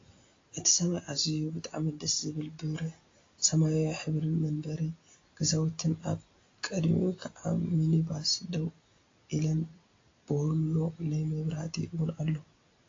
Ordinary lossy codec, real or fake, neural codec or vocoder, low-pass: AAC, 32 kbps; real; none; 7.2 kHz